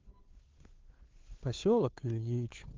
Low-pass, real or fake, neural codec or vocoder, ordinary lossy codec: 7.2 kHz; fake; codec, 16 kHz, 2 kbps, FunCodec, trained on Chinese and English, 25 frames a second; Opus, 16 kbps